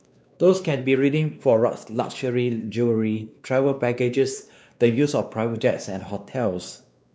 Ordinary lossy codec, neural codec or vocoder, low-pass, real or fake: none; codec, 16 kHz, 2 kbps, X-Codec, WavLM features, trained on Multilingual LibriSpeech; none; fake